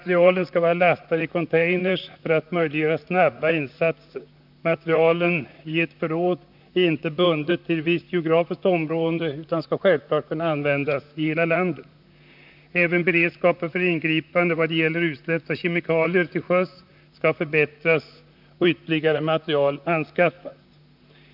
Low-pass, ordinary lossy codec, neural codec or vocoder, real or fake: 5.4 kHz; none; vocoder, 44.1 kHz, 128 mel bands, Pupu-Vocoder; fake